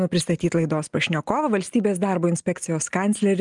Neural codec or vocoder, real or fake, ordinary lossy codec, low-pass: none; real; Opus, 32 kbps; 10.8 kHz